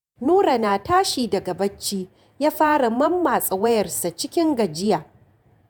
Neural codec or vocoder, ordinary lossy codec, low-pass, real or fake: vocoder, 48 kHz, 128 mel bands, Vocos; none; none; fake